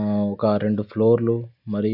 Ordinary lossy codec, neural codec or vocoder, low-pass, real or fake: none; none; 5.4 kHz; real